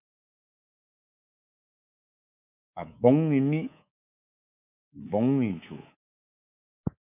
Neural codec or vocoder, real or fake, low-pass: codec, 24 kHz, 3.1 kbps, DualCodec; fake; 3.6 kHz